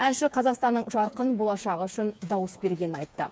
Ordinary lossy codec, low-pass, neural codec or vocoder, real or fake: none; none; codec, 16 kHz, 4 kbps, FreqCodec, smaller model; fake